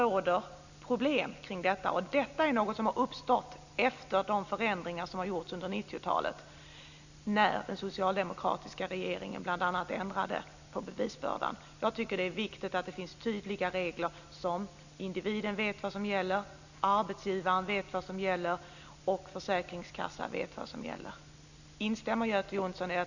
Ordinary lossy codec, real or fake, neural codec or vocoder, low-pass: none; real; none; 7.2 kHz